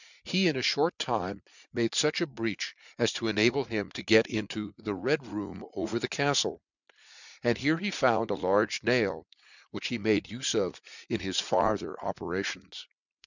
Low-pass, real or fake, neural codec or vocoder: 7.2 kHz; fake; vocoder, 44.1 kHz, 80 mel bands, Vocos